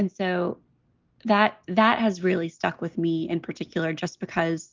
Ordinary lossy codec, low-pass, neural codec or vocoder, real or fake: Opus, 32 kbps; 7.2 kHz; none; real